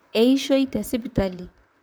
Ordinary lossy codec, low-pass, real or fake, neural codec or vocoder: none; none; fake; vocoder, 44.1 kHz, 128 mel bands, Pupu-Vocoder